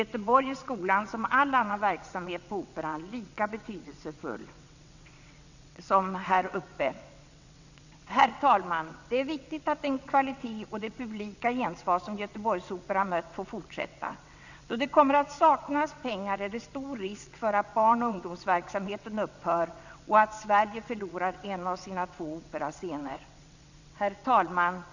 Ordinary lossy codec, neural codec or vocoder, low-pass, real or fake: none; vocoder, 22.05 kHz, 80 mel bands, WaveNeXt; 7.2 kHz; fake